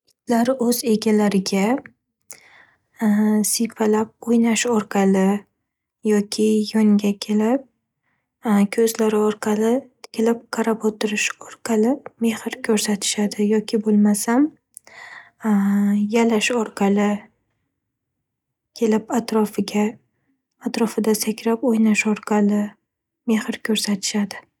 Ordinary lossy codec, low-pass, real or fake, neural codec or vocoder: none; 19.8 kHz; real; none